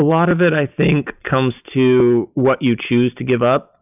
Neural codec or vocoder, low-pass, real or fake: none; 3.6 kHz; real